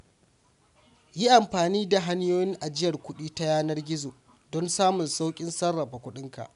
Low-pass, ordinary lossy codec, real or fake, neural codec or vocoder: 10.8 kHz; none; real; none